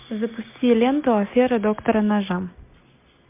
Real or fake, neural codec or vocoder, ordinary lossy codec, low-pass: real; none; MP3, 32 kbps; 3.6 kHz